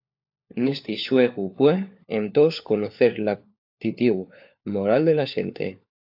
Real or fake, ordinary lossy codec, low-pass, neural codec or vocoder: fake; AAC, 48 kbps; 5.4 kHz; codec, 16 kHz, 4 kbps, FunCodec, trained on LibriTTS, 50 frames a second